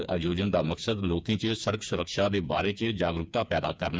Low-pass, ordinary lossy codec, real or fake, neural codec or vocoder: none; none; fake; codec, 16 kHz, 4 kbps, FreqCodec, smaller model